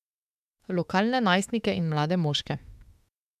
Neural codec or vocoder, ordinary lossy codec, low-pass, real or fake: autoencoder, 48 kHz, 32 numbers a frame, DAC-VAE, trained on Japanese speech; none; 14.4 kHz; fake